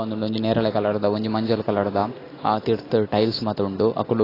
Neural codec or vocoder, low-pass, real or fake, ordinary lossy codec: none; 5.4 kHz; real; AAC, 24 kbps